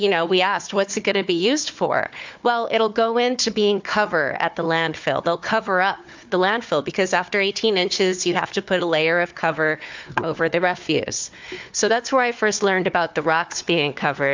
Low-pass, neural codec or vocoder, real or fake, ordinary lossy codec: 7.2 kHz; codec, 16 kHz, 4 kbps, FunCodec, trained on Chinese and English, 50 frames a second; fake; MP3, 64 kbps